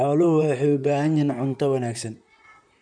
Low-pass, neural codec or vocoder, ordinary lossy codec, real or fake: 9.9 kHz; vocoder, 44.1 kHz, 128 mel bands, Pupu-Vocoder; none; fake